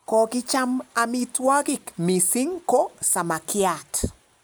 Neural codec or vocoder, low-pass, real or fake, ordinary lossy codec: none; none; real; none